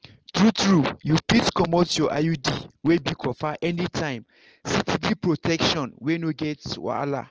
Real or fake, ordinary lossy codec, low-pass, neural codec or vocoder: real; Opus, 16 kbps; 7.2 kHz; none